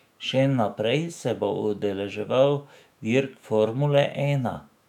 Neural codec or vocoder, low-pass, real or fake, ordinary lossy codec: autoencoder, 48 kHz, 128 numbers a frame, DAC-VAE, trained on Japanese speech; 19.8 kHz; fake; none